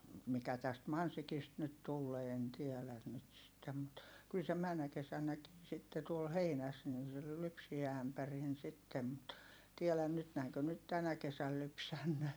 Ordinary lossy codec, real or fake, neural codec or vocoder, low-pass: none; fake; vocoder, 44.1 kHz, 128 mel bands every 256 samples, BigVGAN v2; none